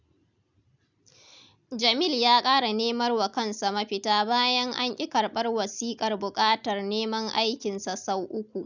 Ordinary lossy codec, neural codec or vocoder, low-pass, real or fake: none; none; 7.2 kHz; real